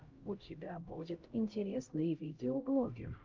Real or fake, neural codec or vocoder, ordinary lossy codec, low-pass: fake; codec, 16 kHz, 0.5 kbps, X-Codec, HuBERT features, trained on LibriSpeech; Opus, 32 kbps; 7.2 kHz